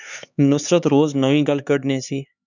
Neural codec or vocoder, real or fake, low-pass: codec, 16 kHz, 4 kbps, X-Codec, HuBERT features, trained on LibriSpeech; fake; 7.2 kHz